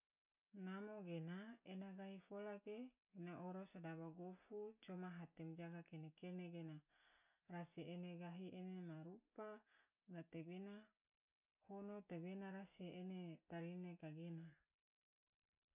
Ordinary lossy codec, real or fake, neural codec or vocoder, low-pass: none; real; none; 3.6 kHz